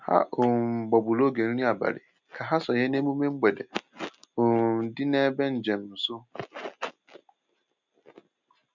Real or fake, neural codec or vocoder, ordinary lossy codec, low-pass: real; none; none; 7.2 kHz